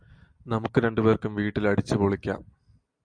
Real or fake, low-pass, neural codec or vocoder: real; 9.9 kHz; none